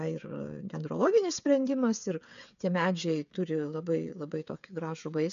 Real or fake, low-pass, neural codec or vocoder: fake; 7.2 kHz; codec, 16 kHz, 8 kbps, FreqCodec, smaller model